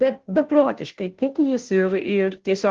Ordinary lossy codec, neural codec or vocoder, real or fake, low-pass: Opus, 16 kbps; codec, 16 kHz, 0.5 kbps, FunCodec, trained on Chinese and English, 25 frames a second; fake; 7.2 kHz